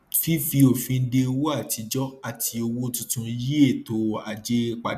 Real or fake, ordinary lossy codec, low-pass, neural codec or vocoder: real; none; 14.4 kHz; none